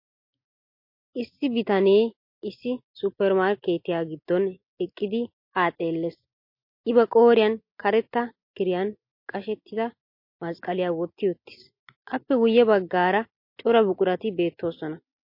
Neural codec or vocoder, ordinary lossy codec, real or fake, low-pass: none; MP3, 32 kbps; real; 5.4 kHz